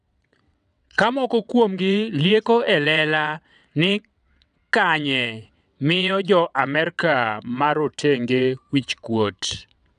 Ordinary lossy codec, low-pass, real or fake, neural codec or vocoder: none; 9.9 kHz; fake; vocoder, 22.05 kHz, 80 mel bands, WaveNeXt